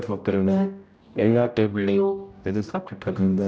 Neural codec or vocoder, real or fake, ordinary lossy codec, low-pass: codec, 16 kHz, 0.5 kbps, X-Codec, HuBERT features, trained on general audio; fake; none; none